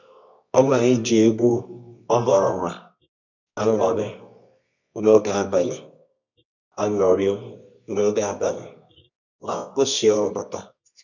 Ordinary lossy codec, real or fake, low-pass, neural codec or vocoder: none; fake; 7.2 kHz; codec, 24 kHz, 0.9 kbps, WavTokenizer, medium music audio release